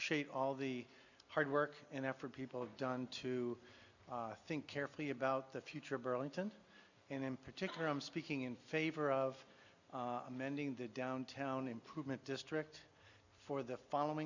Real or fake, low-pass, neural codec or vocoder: real; 7.2 kHz; none